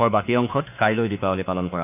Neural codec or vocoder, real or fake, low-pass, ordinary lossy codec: autoencoder, 48 kHz, 32 numbers a frame, DAC-VAE, trained on Japanese speech; fake; 3.6 kHz; none